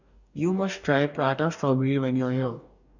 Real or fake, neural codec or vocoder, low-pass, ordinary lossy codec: fake; codec, 44.1 kHz, 2.6 kbps, DAC; 7.2 kHz; none